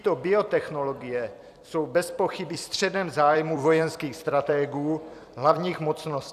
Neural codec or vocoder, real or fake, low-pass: vocoder, 44.1 kHz, 128 mel bands every 256 samples, BigVGAN v2; fake; 14.4 kHz